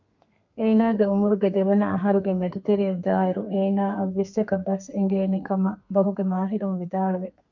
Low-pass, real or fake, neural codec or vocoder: 7.2 kHz; fake; codec, 32 kHz, 1.9 kbps, SNAC